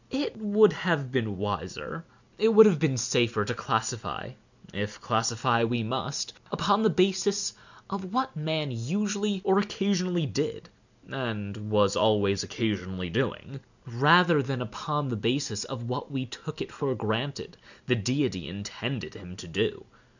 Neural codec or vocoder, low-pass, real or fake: none; 7.2 kHz; real